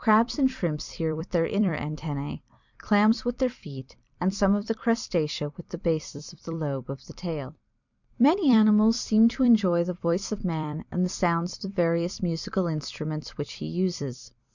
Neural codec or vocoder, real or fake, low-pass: vocoder, 44.1 kHz, 128 mel bands every 256 samples, BigVGAN v2; fake; 7.2 kHz